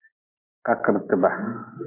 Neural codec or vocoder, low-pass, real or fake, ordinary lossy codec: none; 3.6 kHz; real; MP3, 24 kbps